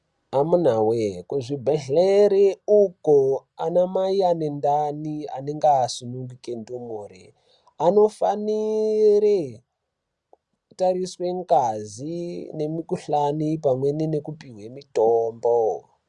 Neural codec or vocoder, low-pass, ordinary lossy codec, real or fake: none; 10.8 kHz; Opus, 64 kbps; real